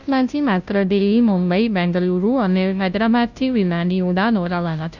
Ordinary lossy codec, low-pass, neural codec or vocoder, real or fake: none; 7.2 kHz; codec, 16 kHz, 0.5 kbps, FunCodec, trained on Chinese and English, 25 frames a second; fake